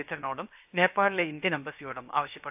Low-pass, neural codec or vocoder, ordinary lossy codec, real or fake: 3.6 kHz; codec, 16 kHz, 0.7 kbps, FocalCodec; AAC, 32 kbps; fake